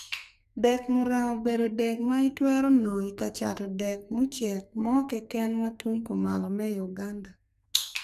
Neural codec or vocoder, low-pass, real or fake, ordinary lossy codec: codec, 44.1 kHz, 2.6 kbps, SNAC; 14.4 kHz; fake; none